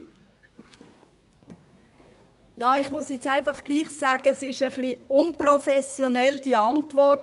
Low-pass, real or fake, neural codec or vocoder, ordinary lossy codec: 10.8 kHz; fake; codec, 24 kHz, 1 kbps, SNAC; none